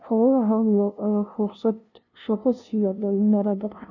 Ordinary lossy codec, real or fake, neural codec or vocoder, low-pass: none; fake; codec, 16 kHz, 0.5 kbps, FunCodec, trained on LibriTTS, 25 frames a second; 7.2 kHz